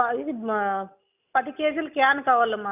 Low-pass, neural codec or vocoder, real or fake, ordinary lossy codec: 3.6 kHz; none; real; none